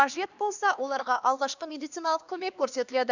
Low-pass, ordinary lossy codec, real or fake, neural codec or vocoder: 7.2 kHz; none; fake; codec, 16 kHz, 2 kbps, X-Codec, HuBERT features, trained on LibriSpeech